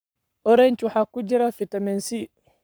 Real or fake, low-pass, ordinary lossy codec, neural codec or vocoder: fake; none; none; codec, 44.1 kHz, 7.8 kbps, Pupu-Codec